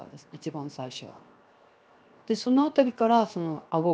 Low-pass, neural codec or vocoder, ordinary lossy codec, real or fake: none; codec, 16 kHz, 0.7 kbps, FocalCodec; none; fake